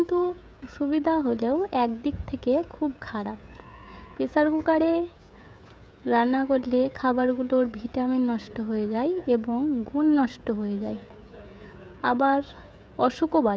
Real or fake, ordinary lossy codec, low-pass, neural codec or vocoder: fake; none; none; codec, 16 kHz, 16 kbps, FreqCodec, smaller model